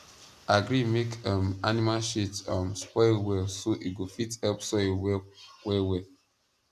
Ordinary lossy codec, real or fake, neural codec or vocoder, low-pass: none; real; none; 14.4 kHz